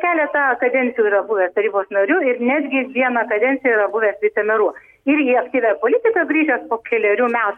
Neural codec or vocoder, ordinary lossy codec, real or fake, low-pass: none; MP3, 64 kbps; real; 19.8 kHz